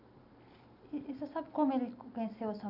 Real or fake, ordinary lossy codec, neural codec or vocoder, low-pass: real; none; none; 5.4 kHz